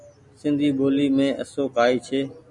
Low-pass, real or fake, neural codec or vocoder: 10.8 kHz; real; none